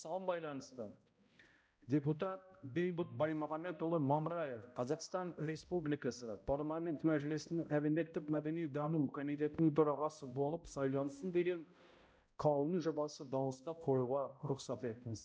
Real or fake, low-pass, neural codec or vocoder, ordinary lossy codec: fake; none; codec, 16 kHz, 0.5 kbps, X-Codec, HuBERT features, trained on balanced general audio; none